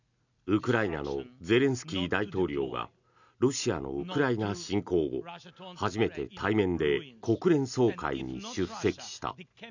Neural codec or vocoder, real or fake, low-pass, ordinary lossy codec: none; real; 7.2 kHz; none